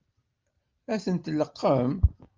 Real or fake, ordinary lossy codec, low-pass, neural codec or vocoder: real; Opus, 24 kbps; 7.2 kHz; none